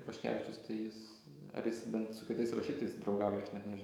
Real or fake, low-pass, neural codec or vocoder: fake; 19.8 kHz; codec, 44.1 kHz, 7.8 kbps, DAC